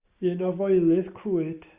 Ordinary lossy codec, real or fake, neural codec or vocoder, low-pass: Opus, 64 kbps; real; none; 3.6 kHz